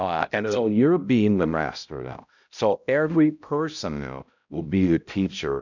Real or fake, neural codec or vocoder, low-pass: fake; codec, 16 kHz, 0.5 kbps, X-Codec, HuBERT features, trained on balanced general audio; 7.2 kHz